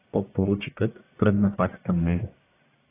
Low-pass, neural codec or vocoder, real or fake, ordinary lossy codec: 3.6 kHz; codec, 44.1 kHz, 1.7 kbps, Pupu-Codec; fake; AAC, 16 kbps